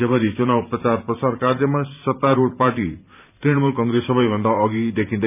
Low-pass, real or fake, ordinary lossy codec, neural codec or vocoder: 3.6 kHz; fake; none; vocoder, 44.1 kHz, 128 mel bands every 512 samples, BigVGAN v2